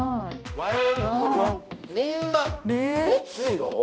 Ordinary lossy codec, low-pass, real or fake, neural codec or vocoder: none; none; fake; codec, 16 kHz, 1 kbps, X-Codec, HuBERT features, trained on balanced general audio